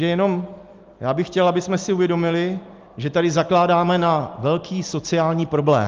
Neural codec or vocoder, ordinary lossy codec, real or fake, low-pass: none; Opus, 32 kbps; real; 7.2 kHz